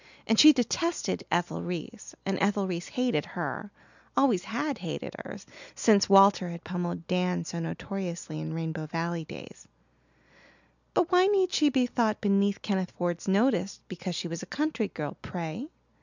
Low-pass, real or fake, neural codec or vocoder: 7.2 kHz; real; none